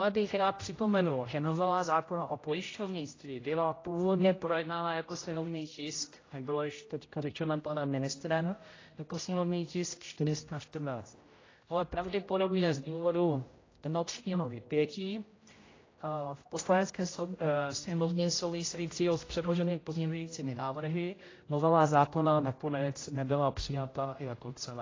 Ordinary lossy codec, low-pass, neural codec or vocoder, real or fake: AAC, 32 kbps; 7.2 kHz; codec, 16 kHz, 0.5 kbps, X-Codec, HuBERT features, trained on general audio; fake